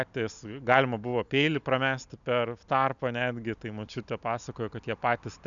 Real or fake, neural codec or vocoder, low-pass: real; none; 7.2 kHz